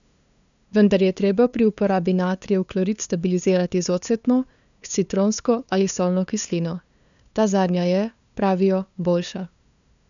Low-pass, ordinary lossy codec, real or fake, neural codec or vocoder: 7.2 kHz; none; fake; codec, 16 kHz, 2 kbps, FunCodec, trained on LibriTTS, 25 frames a second